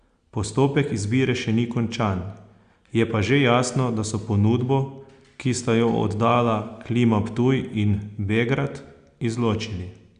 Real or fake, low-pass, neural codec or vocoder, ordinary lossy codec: real; 9.9 kHz; none; Opus, 64 kbps